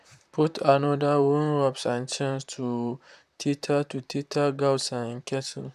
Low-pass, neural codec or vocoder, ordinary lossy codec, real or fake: 14.4 kHz; vocoder, 44.1 kHz, 128 mel bands, Pupu-Vocoder; AAC, 96 kbps; fake